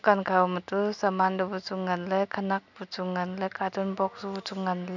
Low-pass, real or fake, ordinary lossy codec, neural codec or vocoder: 7.2 kHz; real; none; none